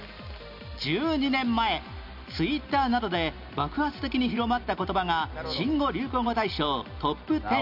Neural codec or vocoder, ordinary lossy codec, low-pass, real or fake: none; none; 5.4 kHz; real